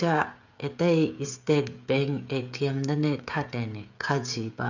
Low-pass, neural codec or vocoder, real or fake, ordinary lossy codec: 7.2 kHz; codec, 16 kHz, 16 kbps, FreqCodec, smaller model; fake; none